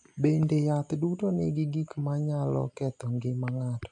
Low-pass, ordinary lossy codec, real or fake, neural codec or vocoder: 9.9 kHz; AAC, 64 kbps; real; none